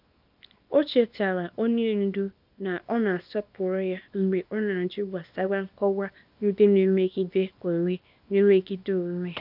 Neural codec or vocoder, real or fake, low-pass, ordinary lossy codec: codec, 24 kHz, 0.9 kbps, WavTokenizer, small release; fake; 5.4 kHz; none